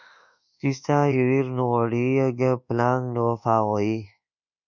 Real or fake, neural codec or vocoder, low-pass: fake; codec, 24 kHz, 1.2 kbps, DualCodec; 7.2 kHz